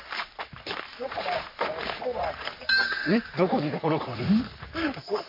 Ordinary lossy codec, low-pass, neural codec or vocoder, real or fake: MP3, 32 kbps; 5.4 kHz; codec, 44.1 kHz, 3.4 kbps, Pupu-Codec; fake